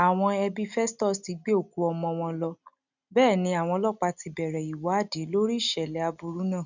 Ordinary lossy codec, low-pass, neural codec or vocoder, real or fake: none; 7.2 kHz; none; real